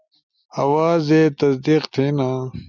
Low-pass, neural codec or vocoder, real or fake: 7.2 kHz; none; real